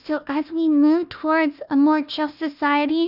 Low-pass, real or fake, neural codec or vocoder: 5.4 kHz; fake; codec, 16 kHz, 1 kbps, FunCodec, trained on Chinese and English, 50 frames a second